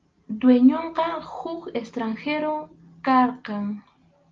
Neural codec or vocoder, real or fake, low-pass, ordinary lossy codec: none; real; 7.2 kHz; Opus, 24 kbps